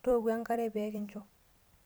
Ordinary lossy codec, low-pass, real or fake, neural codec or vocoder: none; none; fake; vocoder, 44.1 kHz, 128 mel bands every 256 samples, BigVGAN v2